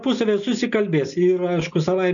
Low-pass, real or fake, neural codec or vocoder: 7.2 kHz; real; none